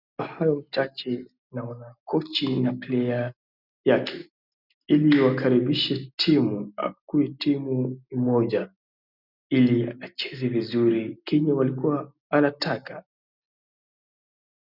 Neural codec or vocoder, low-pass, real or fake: none; 5.4 kHz; real